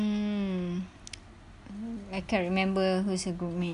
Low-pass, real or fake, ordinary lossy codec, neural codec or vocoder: 10.8 kHz; real; none; none